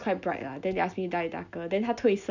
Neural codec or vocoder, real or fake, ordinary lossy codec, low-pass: none; real; none; 7.2 kHz